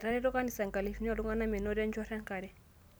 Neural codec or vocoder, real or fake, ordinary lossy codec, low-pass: none; real; none; none